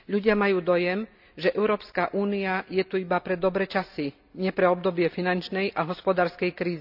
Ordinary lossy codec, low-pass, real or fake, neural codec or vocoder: none; 5.4 kHz; real; none